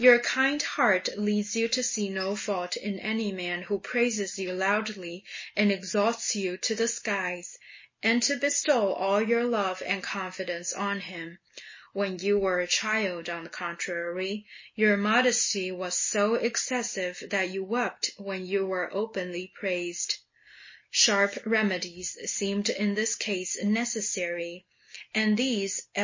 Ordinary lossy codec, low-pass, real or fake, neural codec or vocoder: MP3, 32 kbps; 7.2 kHz; real; none